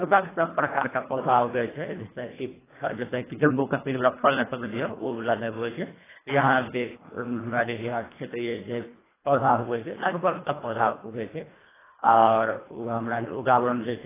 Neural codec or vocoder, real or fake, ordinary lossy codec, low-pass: codec, 24 kHz, 1.5 kbps, HILCodec; fake; AAC, 16 kbps; 3.6 kHz